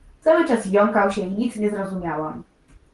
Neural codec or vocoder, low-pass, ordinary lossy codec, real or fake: none; 10.8 kHz; Opus, 24 kbps; real